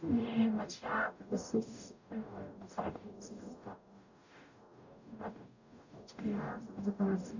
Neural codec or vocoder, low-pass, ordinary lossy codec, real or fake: codec, 44.1 kHz, 0.9 kbps, DAC; 7.2 kHz; none; fake